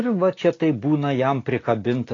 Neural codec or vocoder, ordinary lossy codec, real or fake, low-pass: none; AAC, 32 kbps; real; 7.2 kHz